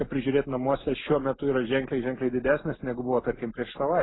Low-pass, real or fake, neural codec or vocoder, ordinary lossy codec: 7.2 kHz; real; none; AAC, 16 kbps